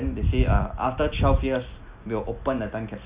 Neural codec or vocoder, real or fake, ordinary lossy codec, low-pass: none; real; Opus, 64 kbps; 3.6 kHz